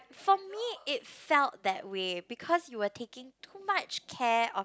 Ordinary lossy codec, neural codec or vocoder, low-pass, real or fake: none; none; none; real